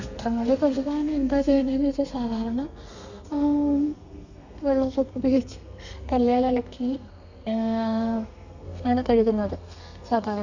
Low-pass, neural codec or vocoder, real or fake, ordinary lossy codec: 7.2 kHz; codec, 32 kHz, 1.9 kbps, SNAC; fake; none